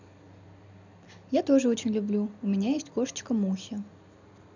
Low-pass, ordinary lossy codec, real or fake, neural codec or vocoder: 7.2 kHz; none; real; none